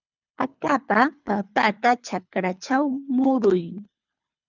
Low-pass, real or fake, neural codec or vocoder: 7.2 kHz; fake; codec, 24 kHz, 3 kbps, HILCodec